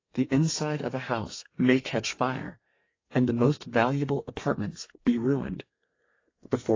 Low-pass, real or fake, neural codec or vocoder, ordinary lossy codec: 7.2 kHz; fake; codec, 32 kHz, 1.9 kbps, SNAC; AAC, 32 kbps